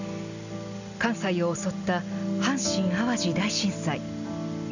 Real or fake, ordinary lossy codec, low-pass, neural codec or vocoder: real; none; 7.2 kHz; none